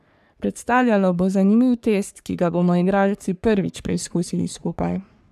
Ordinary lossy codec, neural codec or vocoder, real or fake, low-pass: none; codec, 44.1 kHz, 3.4 kbps, Pupu-Codec; fake; 14.4 kHz